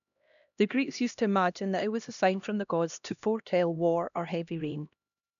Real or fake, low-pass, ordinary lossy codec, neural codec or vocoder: fake; 7.2 kHz; none; codec, 16 kHz, 1 kbps, X-Codec, HuBERT features, trained on LibriSpeech